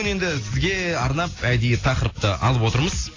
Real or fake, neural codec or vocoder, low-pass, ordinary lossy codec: real; none; 7.2 kHz; AAC, 32 kbps